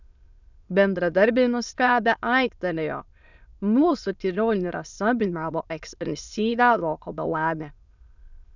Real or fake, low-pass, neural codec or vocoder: fake; 7.2 kHz; autoencoder, 22.05 kHz, a latent of 192 numbers a frame, VITS, trained on many speakers